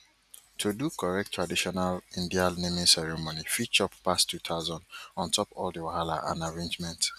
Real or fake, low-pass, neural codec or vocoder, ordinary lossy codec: real; 14.4 kHz; none; none